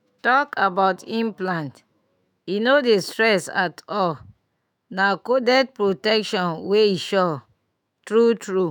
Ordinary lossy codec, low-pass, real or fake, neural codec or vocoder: none; 19.8 kHz; fake; autoencoder, 48 kHz, 128 numbers a frame, DAC-VAE, trained on Japanese speech